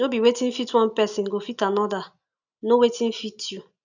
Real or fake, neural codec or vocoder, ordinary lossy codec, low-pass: real; none; none; 7.2 kHz